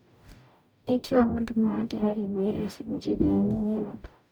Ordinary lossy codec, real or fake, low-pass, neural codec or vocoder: none; fake; none; codec, 44.1 kHz, 0.9 kbps, DAC